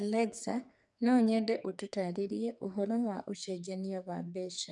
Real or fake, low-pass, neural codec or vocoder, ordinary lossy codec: fake; 10.8 kHz; codec, 44.1 kHz, 2.6 kbps, SNAC; none